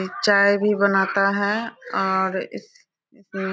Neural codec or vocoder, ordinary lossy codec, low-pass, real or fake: none; none; none; real